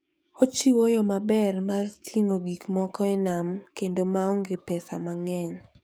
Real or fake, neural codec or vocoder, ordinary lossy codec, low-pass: fake; codec, 44.1 kHz, 7.8 kbps, DAC; none; none